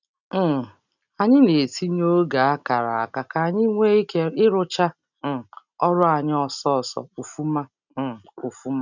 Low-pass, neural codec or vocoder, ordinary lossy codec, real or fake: 7.2 kHz; none; none; real